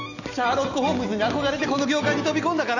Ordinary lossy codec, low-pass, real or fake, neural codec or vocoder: none; 7.2 kHz; real; none